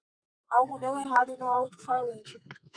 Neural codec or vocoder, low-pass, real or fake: codec, 32 kHz, 1.9 kbps, SNAC; 9.9 kHz; fake